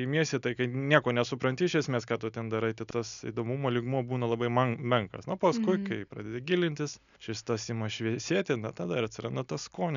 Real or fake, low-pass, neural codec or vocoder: real; 7.2 kHz; none